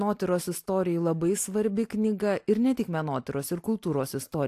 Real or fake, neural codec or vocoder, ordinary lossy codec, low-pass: real; none; AAC, 64 kbps; 14.4 kHz